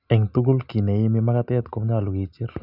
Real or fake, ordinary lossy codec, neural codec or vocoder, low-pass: real; none; none; 5.4 kHz